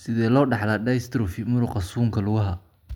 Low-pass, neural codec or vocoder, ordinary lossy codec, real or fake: 19.8 kHz; none; none; real